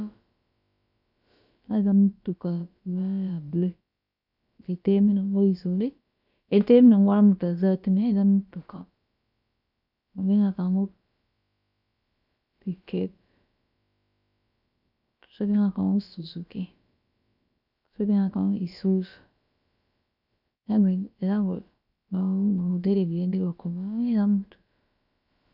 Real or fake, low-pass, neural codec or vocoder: fake; 5.4 kHz; codec, 16 kHz, about 1 kbps, DyCAST, with the encoder's durations